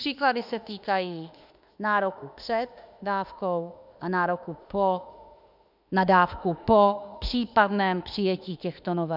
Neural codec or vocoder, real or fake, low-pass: autoencoder, 48 kHz, 32 numbers a frame, DAC-VAE, trained on Japanese speech; fake; 5.4 kHz